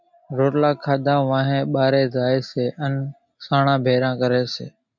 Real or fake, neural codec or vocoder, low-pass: real; none; 7.2 kHz